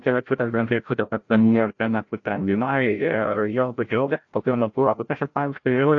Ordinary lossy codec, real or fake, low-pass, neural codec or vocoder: AAC, 48 kbps; fake; 7.2 kHz; codec, 16 kHz, 0.5 kbps, FreqCodec, larger model